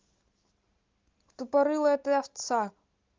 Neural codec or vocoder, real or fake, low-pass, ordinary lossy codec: none; real; 7.2 kHz; Opus, 32 kbps